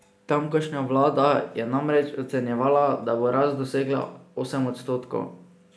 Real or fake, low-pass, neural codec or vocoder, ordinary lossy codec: real; none; none; none